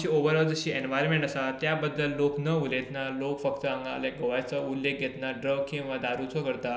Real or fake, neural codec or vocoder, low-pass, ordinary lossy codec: real; none; none; none